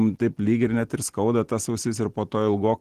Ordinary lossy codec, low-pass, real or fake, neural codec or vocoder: Opus, 16 kbps; 14.4 kHz; real; none